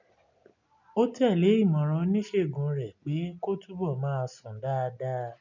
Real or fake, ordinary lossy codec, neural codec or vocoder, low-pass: real; none; none; 7.2 kHz